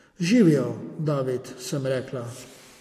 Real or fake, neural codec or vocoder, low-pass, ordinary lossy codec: real; none; 14.4 kHz; AAC, 48 kbps